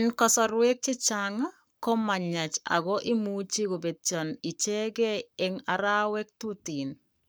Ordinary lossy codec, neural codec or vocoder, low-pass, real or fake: none; codec, 44.1 kHz, 7.8 kbps, Pupu-Codec; none; fake